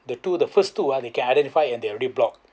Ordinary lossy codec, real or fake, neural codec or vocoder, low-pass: none; real; none; none